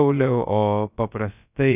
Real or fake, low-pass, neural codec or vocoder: fake; 3.6 kHz; codec, 16 kHz, about 1 kbps, DyCAST, with the encoder's durations